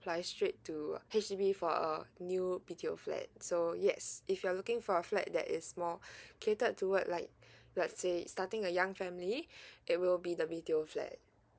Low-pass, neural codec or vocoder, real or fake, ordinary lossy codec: none; none; real; none